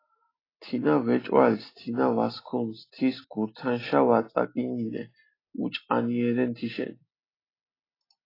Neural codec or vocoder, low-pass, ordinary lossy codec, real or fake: none; 5.4 kHz; AAC, 24 kbps; real